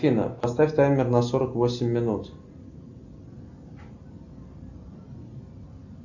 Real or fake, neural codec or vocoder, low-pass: real; none; 7.2 kHz